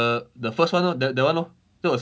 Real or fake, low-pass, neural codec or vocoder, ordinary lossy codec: real; none; none; none